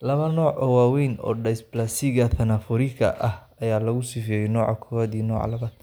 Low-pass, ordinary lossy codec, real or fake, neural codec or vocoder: none; none; real; none